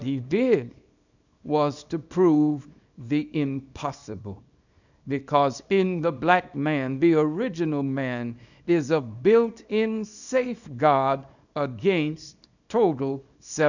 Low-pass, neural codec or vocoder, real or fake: 7.2 kHz; codec, 24 kHz, 0.9 kbps, WavTokenizer, small release; fake